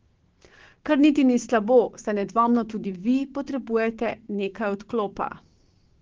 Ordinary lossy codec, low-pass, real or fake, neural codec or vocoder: Opus, 16 kbps; 7.2 kHz; fake; codec, 16 kHz, 6 kbps, DAC